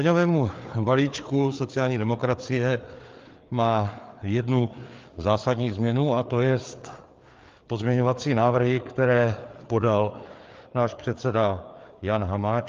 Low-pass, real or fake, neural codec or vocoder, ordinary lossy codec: 7.2 kHz; fake; codec, 16 kHz, 4 kbps, FreqCodec, larger model; Opus, 32 kbps